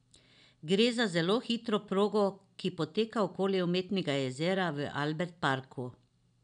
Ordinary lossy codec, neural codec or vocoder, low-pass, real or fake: none; none; 9.9 kHz; real